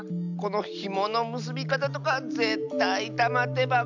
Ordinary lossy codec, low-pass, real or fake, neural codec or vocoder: none; 7.2 kHz; real; none